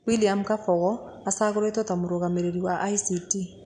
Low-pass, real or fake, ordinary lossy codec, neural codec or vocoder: 9.9 kHz; real; none; none